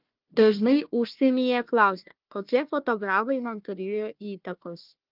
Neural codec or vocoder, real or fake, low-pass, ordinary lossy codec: codec, 16 kHz, 1 kbps, FunCodec, trained on Chinese and English, 50 frames a second; fake; 5.4 kHz; Opus, 24 kbps